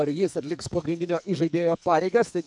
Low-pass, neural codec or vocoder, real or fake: 10.8 kHz; codec, 44.1 kHz, 2.6 kbps, SNAC; fake